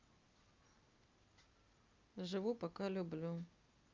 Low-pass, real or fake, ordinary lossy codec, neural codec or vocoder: 7.2 kHz; real; Opus, 24 kbps; none